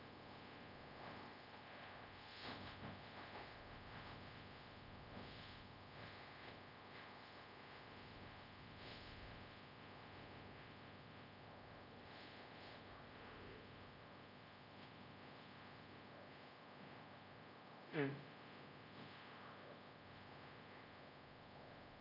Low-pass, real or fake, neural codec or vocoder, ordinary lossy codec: 5.4 kHz; fake; codec, 24 kHz, 0.5 kbps, DualCodec; none